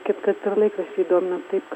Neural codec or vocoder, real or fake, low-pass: none; real; 19.8 kHz